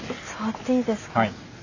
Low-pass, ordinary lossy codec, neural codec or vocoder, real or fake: 7.2 kHz; none; none; real